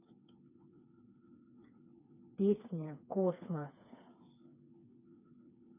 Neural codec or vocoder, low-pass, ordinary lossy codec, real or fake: codec, 16 kHz, 4 kbps, FreqCodec, smaller model; 3.6 kHz; none; fake